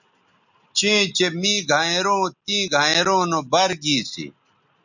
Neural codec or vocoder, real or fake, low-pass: none; real; 7.2 kHz